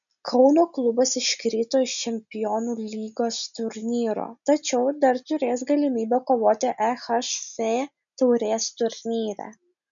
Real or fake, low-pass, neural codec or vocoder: real; 7.2 kHz; none